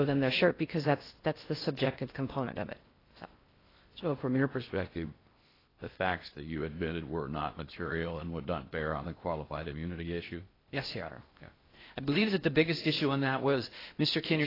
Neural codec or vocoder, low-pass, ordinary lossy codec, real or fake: codec, 16 kHz in and 24 kHz out, 0.6 kbps, FocalCodec, streaming, 4096 codes; 5.4 kHz; AAC, 24 kbps; fake